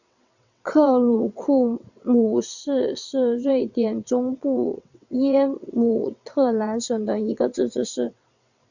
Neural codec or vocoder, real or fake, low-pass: vocoder, 22.05 kHz, 80 mel bands, WaveNeXt; fake; 7.2 kHz